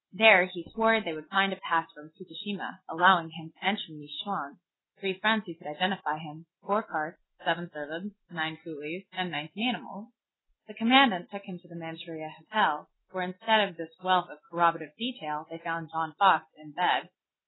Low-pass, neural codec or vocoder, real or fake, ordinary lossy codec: 7.2 kHz; none; real; AAC, 16 kbps